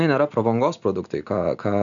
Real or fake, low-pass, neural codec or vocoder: real; 7.2 kHz; none